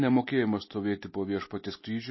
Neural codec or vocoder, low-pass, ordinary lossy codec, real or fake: none; 7.2 kHz; MP3, 24 kbps; real